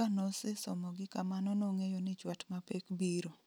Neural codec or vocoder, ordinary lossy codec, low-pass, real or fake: none; none; none; real